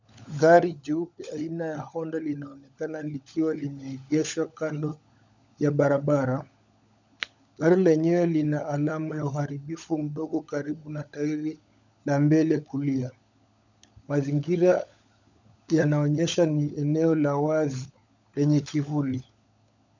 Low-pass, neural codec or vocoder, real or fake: 7.2 kHz; codec, 16 kHz, 16 kbps, FunCodec, trained on LibriTTS, 50 frames a second; fake